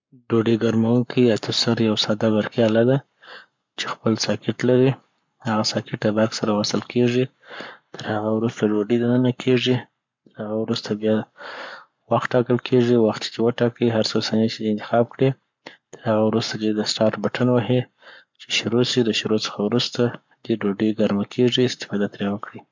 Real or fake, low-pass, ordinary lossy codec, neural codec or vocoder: fake; 7.2 kHz; MP3, 64 kbps; codec, 44.1 kHz, 7.8 kbps, Pupu-Codec